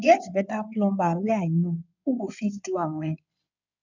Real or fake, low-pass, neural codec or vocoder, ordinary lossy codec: fake; 7.2 kHz; codec, 16 kHz in and 24 kHz out, 2.2 kbps, FireRedTTS-2 codec; none